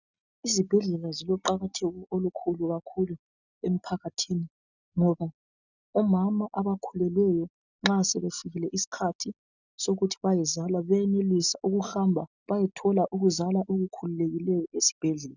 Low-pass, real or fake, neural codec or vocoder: 7.2 kHz; real; none